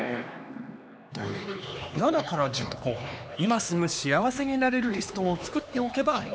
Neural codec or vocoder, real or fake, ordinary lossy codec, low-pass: codec, 16 kHz, 2 kbps, X-Codec, HuBERT features, trained on LibriSpeech; fake; none; none